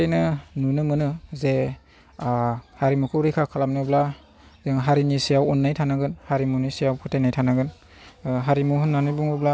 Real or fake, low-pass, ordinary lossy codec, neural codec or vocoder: real; none; none; none